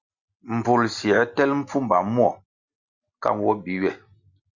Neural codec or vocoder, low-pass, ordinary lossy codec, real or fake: none; 7.2 kHz; Opus, 64 kbps; real